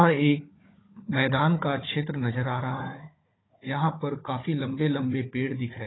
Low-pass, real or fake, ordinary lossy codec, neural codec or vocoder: 7.2 kHz; fake; AAC, 16 kbps; codec, 16 kHz, 16 kbps, FunCodec, trained on Chinese and English, 50 frames a second